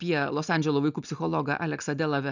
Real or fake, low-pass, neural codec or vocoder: real; 7.2 kHz; none